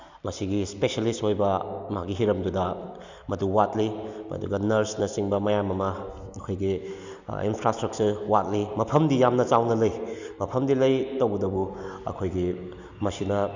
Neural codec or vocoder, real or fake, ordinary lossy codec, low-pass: none; real; Opus, 64 kbps; 7.2 kHz